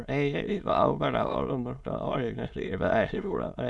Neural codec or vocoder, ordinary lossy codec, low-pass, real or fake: autoencoder, 22.05 kHz, a latent of 192 numbers a frame, VITS, trained on many speakers; none; none; fake